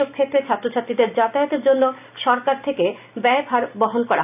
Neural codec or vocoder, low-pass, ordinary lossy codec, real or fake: none; 3.6 kHz; none; real